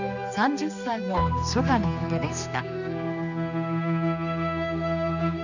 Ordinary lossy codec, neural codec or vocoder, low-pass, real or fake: none; codec, 16 kHz, 2 kbps, X-Codec, HuBERT features, trained on general audio; 7.2 kHz; fake